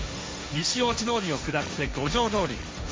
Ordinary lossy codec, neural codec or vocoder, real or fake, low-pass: none; codec, 16 kHz, 1.1 kbps, Voila-Tokenizer; fake; none